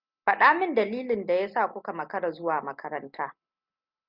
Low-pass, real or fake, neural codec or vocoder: 5.4 kHz; real; none